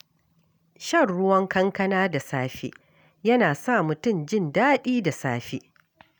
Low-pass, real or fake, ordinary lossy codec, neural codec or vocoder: none; real; none; none